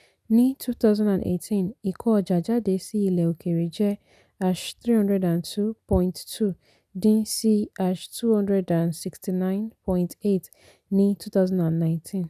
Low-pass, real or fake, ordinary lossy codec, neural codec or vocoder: 14.4 kHz; real; none; none